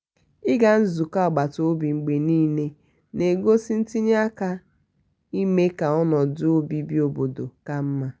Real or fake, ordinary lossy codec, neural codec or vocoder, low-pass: real; none; none; none